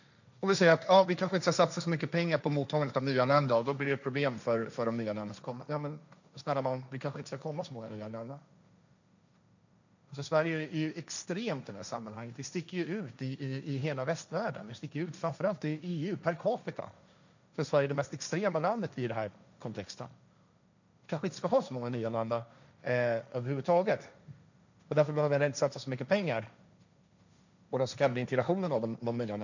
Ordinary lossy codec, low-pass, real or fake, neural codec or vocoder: none; 7.2 kHz; fake; codec, 16 kHz, 1.1 kbps, Voila-Tokenizer